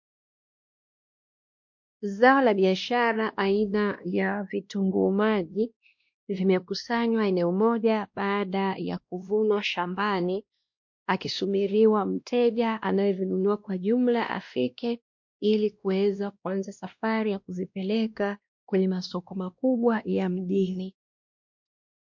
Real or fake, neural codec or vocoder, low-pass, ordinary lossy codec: fake; codec, 16 kHz, 1 kbps, X-Codec, WavLM features, trained on Multilingual LibriSpeech; 7.2 kHz; MP3, 48 kbps